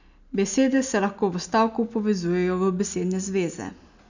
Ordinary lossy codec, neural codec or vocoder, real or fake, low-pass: none; none; real; 7.2 kHz